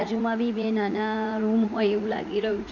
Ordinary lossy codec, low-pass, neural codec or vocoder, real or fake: none; 7.2 kHz; vocoder, 44.1 kHz, 80 mel bands, Vocos; fake